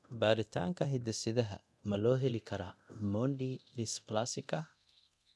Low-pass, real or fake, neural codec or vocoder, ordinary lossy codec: none; fake; codec, 24 kHz, 0.9 kbps, DualCodec; none